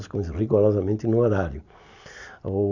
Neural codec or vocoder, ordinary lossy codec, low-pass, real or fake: none; none; 7.2 kHz; real